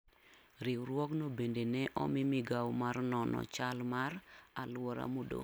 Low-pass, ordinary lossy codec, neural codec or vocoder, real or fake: none; none; none; real